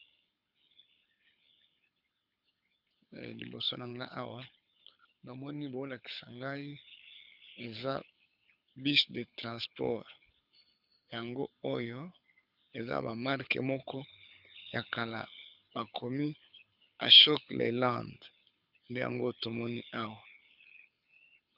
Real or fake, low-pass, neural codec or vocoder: fake; 5.4 kHz; codec, 24 kHz, 6 kbps, HILCodec